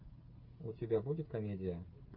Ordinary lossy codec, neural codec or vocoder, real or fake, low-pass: Opus, 24 kbps; codec, 16 kHz, 16 kbps, FreqCodec, smaller model; fake; 5.4 kHz